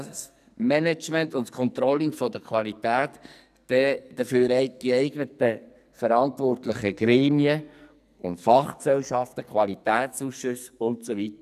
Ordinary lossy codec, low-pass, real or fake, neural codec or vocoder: none; 14.4 kHz; fake; codec, 44.1 kHz, 2.6 kbps, SNAC